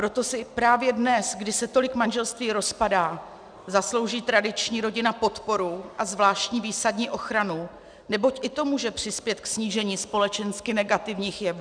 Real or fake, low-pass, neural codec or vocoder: real; 9.9 kHz; none